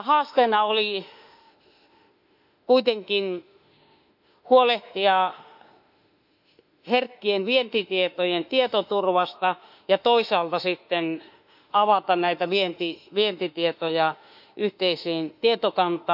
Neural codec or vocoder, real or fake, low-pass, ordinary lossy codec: autoencoder, 48 kHz, 32 numbers a frame, DAC-VAE, trained on Japanese speech; fake; 5.4 kHz; none